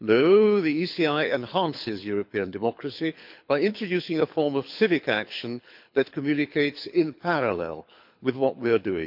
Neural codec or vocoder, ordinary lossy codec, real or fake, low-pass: codec, 24 kHz, 6 kbps, HILCodec; MP3, 48 kbps; fake; 5.4 kHz